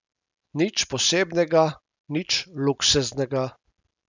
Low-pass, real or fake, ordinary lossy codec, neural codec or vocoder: 7.2 kHz; real; none; none